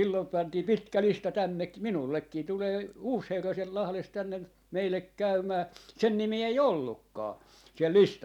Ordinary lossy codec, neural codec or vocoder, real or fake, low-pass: none; none; real; 19.8 kHz